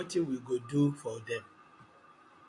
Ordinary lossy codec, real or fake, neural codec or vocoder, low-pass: AAC, 48 kbps; real; none; 10.8 kHz